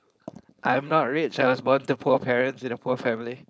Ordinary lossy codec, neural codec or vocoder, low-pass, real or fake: none; codec, 16 kHz, 4.8 kbps, FACodec; none; fake